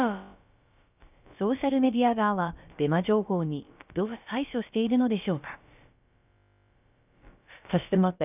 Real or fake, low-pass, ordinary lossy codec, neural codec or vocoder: fake; 3.6 kHz; none; codec, 16 kHz, about 1 kbps, DyCAST, with the encoder's durations